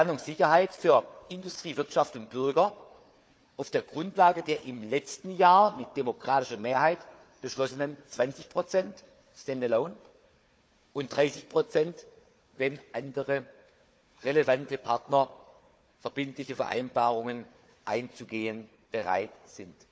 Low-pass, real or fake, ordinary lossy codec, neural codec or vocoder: none; fake; none; codec, 16 kHz, 4 kbps, FunCodec, trained on Chinese and English, 50 frames a second